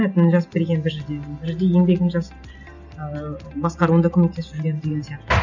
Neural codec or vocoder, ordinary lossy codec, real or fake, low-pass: none; none; real; 7.2 kHz